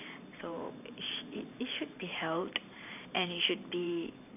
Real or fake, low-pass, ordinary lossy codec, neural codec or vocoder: fake; 3.6 kHz; none; vocoder, 44.1 kHz, 128 mel bands every 512 samples, BigVGAN v2